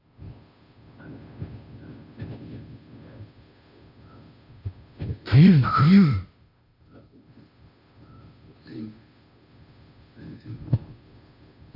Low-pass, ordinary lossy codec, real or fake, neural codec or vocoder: 5.4 kHz; none; fake; codec, 16 kHz, 0.5 kbps, FunCodec, trained on Chinese and English, 25 frames a second